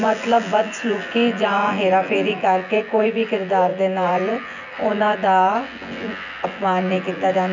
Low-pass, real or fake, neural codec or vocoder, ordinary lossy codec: 7.2 kHz; fake; vocoder, 24 kHz, 100 mel bands, Vocos; none